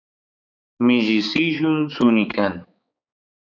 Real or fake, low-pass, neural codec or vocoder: fake; 7.2 kHz; codec, 16 kHz, 4 kbps, X-Codec, HuBERT features, trained on balanced general audio